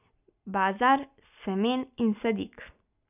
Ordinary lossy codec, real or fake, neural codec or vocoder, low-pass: none; real; none; 3.6 kHz